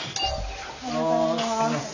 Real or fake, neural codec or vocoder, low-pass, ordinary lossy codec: real; none; 7.2 kHz; none